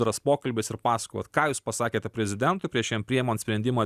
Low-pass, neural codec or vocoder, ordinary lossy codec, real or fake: 14.4 kHz; none; Opus, 64 kbps; real